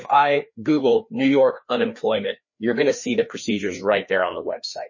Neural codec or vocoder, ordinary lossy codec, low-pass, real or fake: codec, 16 kHz, 2 kbps, FreqCodec, larger model; MP3, 32 kbps; 7.2 kHz; fake